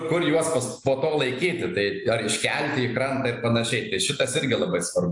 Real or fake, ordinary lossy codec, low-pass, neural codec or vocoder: real; AAC, 64 kbps; 10.8 kHz; none